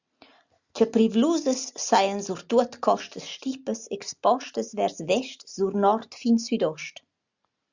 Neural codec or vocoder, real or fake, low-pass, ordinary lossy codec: none; real; 7.2 kHz; Opus, 64 kbps